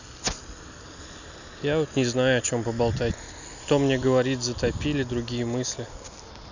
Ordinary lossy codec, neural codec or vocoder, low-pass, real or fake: none; none; 7.2 kHz; real